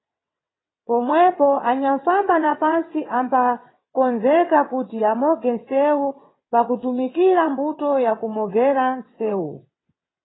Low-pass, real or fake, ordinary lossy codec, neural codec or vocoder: 7.2 kHz; fake; AAC, 16 kbps; vocoder, 22.05 kHz, 80 mel bands, WaveNeXt